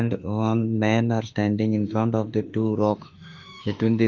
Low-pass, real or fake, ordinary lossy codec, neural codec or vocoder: 7.2 kHz; fake; Opus, 24 kbps; autoencoder, 48 kHz, 32 numbers a frame, DAC-VAE, trained on Japanese speech